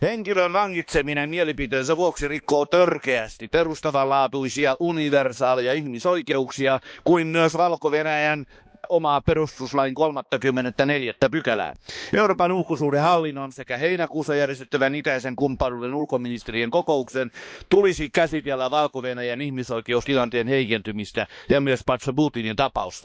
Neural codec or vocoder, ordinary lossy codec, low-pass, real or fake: codec, 16 kHz, 2 kbps, X-Codec, HuBERT features, trained on balanced general audio; none; none; fake